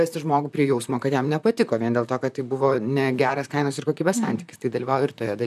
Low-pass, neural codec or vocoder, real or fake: 14.4 kHz; vocoder, 44.1 kHz, 128 mel bands, Pupu-Vocoder; fake